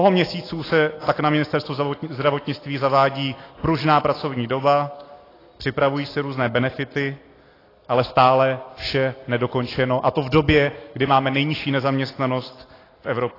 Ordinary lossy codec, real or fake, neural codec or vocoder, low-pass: AAC, 24 kbps; real; none; 5.4 kHz